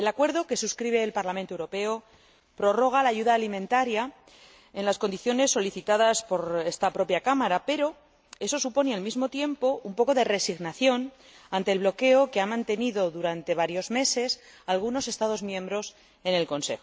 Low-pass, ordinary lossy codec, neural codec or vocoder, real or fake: none; none; none; real